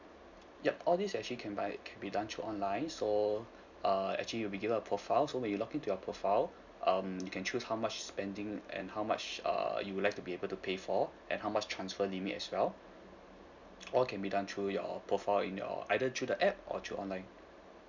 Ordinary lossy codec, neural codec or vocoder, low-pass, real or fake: none; none; 7.2 kHz; real